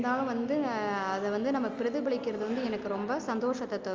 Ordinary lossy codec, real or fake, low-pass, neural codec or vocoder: Opus, 32 kbps; real; 7.2 kHz; none